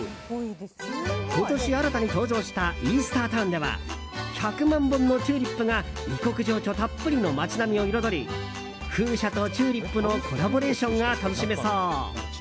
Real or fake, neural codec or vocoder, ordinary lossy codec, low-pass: real; none; none; none